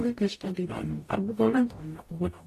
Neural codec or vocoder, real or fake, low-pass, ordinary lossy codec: codec, 44.1 kHz, 0.9 kbps, DAC; fake; 14.4 kHz; AAC, 48 kbps